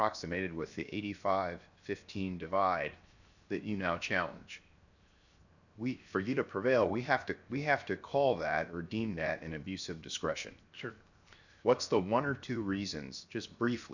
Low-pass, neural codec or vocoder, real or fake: 7.2 kHz; codec, 16 kHz, 0.7 kbps, FocalCodec; fake